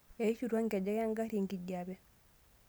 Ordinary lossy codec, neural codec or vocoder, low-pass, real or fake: none; none; none; real